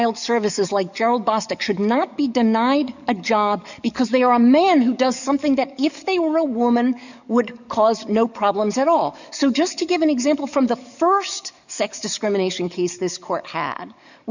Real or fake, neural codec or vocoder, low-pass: fake; codec, 44.1 kHz, 7.8 kbps, DAC; 7.2 kHz